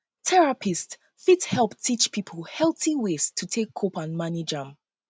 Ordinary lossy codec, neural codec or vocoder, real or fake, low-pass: none; none; real; none